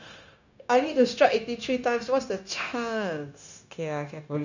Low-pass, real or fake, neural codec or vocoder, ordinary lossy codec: 7.2 kHz; fake; codec, 16 kHz, 0.9 kbps, LongCat-Audio-Codec; none